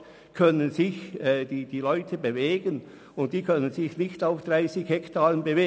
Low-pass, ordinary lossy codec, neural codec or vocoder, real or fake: none; none; none; real